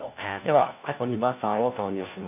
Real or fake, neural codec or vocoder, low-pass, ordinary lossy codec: fake; codec, 16 kHz, 1 kbps, FunCodec, trained on LibriTTS, 50 frames a second; 3.6 kHz; none